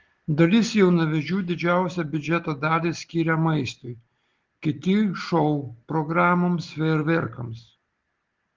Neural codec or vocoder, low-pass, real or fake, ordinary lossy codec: none; 7.2 kHz; real; Opus, 16 kbps